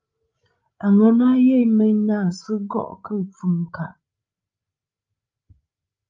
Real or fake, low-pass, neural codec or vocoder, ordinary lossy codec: fake; 7.2 kHz; codec, 16 kHz, 16 kbps, FreqCodec, larger model; Opus, 24 kbps